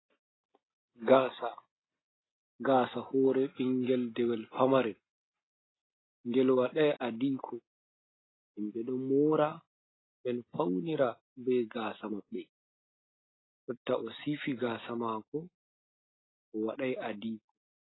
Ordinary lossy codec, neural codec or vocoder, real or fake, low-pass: AAC, 16 kbps; none; real; 7.2 kHz